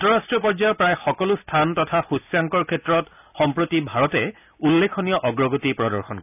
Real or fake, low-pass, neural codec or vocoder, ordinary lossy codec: real; 3.6 kHz; none; none